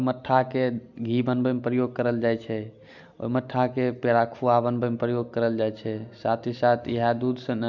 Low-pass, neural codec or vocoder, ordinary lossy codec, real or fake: 7.2 kHz; none; none; real